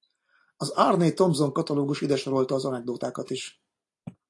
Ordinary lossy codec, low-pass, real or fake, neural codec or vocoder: AAC, 48 kbps; 10.8 kHz; real; none